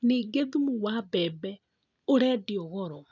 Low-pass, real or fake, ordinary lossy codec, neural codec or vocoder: 7.2 kHz; real; none; none